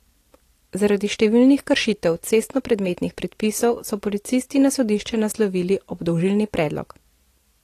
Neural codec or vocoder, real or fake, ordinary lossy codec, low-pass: none; real; AAC, 48 kbps; 14.4 kHz